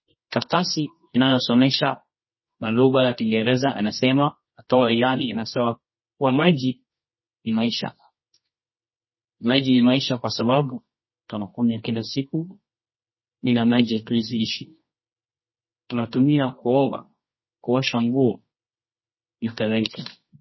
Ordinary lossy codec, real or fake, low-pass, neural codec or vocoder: MP3, 24 kbps; fake; 7.2 kHz; codec, 24 kHz, 0.9 kbps, WavTokenizer, medium music audio release